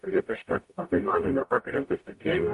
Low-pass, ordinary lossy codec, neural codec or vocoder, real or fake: 14.4 kHz; MP3, 48 kbps; codec, 44.1 kHz, 0.9 kbps, DAC; fake